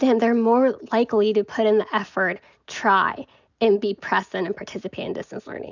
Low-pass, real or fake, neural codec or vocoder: 7.2 kHz; real; none